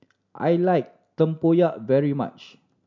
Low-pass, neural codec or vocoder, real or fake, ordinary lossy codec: 7.2 kHz; none; real; MP3, 64 kbps